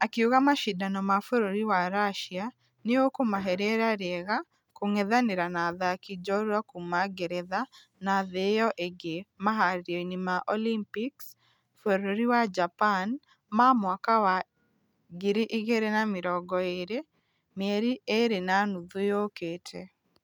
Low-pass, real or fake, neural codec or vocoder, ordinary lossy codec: 19.8 kHz; real; none; none